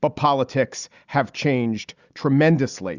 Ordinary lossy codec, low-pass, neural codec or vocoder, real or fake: Opus, 64 kbps; 7.2 kHz; none; real